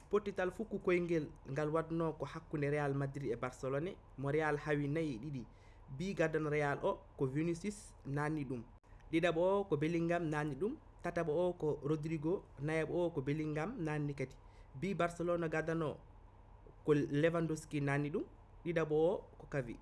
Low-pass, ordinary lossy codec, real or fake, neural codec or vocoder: none; none; real; none